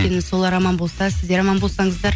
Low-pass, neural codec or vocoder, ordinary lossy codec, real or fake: none; none; none; real